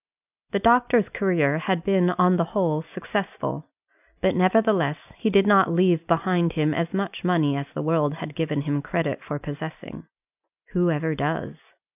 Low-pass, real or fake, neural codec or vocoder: 3.6 kHz; real; none